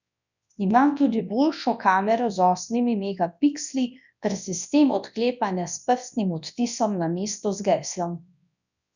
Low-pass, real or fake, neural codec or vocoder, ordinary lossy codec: 7.2 kHz; fake; codec, 24 kHz, 0.9 kbps, WavTokenizer, large speech release; none